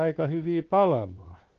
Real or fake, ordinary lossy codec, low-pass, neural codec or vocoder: fake; Opus, 16 kbps; 7.2 kHz; codec, 16 kHz, 2 kbps, X-Codec, WavLM features, trained on Multilingual LibriSpeech